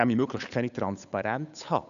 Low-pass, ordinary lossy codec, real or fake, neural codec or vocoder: 7.2 kHz; none; fake; codec, 16 kHz, 8 kbps, FunCodec, trained on Chinese and English, 25 frames a second